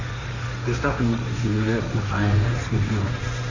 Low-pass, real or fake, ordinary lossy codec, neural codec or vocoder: 7.2 kHz; fake; none; codec, 16 kHz, 1.1 kbps, Voila-Tokenizer